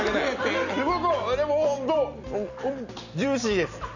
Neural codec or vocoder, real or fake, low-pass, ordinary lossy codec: none; real; 7.2 kHz; none